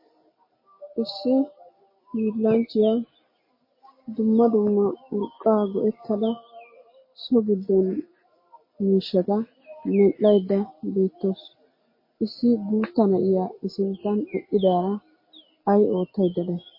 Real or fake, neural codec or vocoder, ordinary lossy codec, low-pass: real; none; MP3, 24 kbps; 5.4 kHz